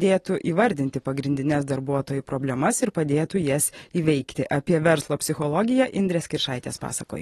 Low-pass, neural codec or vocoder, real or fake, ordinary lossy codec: 19.8 kHz; none; real; AAC, 32 kbps